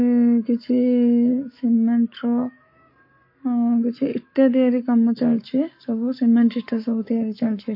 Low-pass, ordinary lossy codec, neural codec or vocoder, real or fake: 5.4 kHz; MP3, 32 kbps; codec, 44.1 kHz, 7.8 kbps, Pupu-Codec; fake